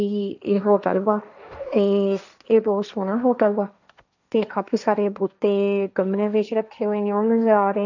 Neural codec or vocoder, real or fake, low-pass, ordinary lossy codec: codec, 16 kHz, 1.1 kbps, Voila-Tokenizer; fake; 7.2 kHz; none